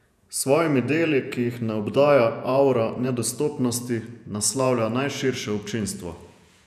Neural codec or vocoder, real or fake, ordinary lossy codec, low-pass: vocoder, 48 kHz, 128 mel bands, Vocos; fake; none; 14.4 kHz